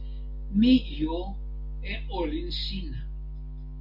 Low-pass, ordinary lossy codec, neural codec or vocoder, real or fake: 5.4 kHz; MP3, 32 kbps; none; real